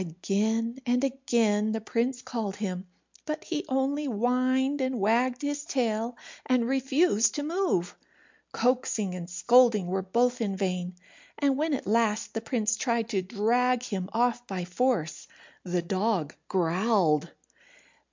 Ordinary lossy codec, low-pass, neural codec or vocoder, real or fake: MP3, 64 kbps; 7.2 kHz; none; real